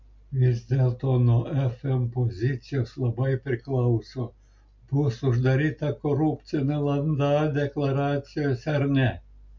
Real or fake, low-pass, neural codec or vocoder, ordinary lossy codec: real; 7.2 kHz; none; MP3, 64 kbps